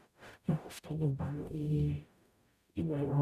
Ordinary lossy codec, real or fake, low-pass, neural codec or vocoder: none; fake; 14.4 kHz; codec, 44.1 kHz, 0.9 kbps, DAC